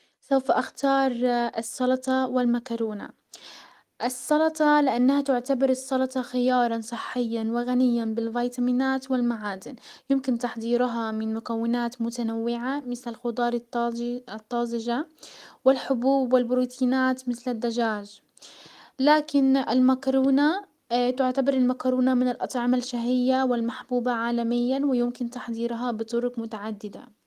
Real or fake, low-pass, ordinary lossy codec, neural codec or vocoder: real; 14.4 kHz; Opus, 24 kbps; none